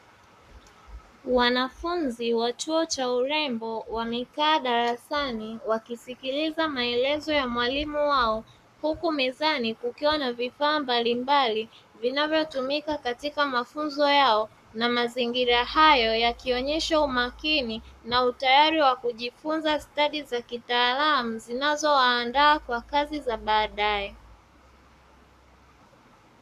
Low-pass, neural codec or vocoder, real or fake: 14.4 kHz; codec, 44.1 kHz, 7.8 kbps, Pupu-Codec; fake